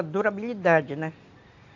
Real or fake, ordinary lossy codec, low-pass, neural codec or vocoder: real; AAC, 48 kbps; 7.2 kHz; none